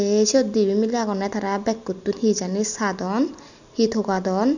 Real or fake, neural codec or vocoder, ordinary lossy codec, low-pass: real; none; none; 7.2 kHz